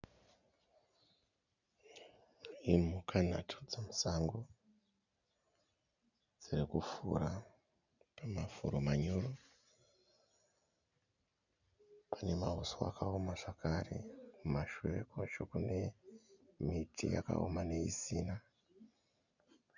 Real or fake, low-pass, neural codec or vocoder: real; 7.2 kHz; none